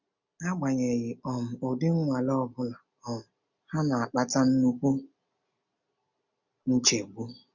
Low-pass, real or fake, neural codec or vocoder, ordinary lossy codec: 7.2 kHz; real; none; none